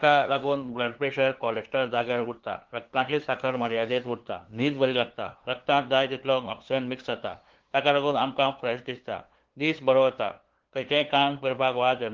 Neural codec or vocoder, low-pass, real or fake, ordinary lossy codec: codec, 16 kHz, 2 kbps, FunCodec, trained on LibriTTS, 25 frames a second; 7.2 kHz; fake; Opus, 16 kbps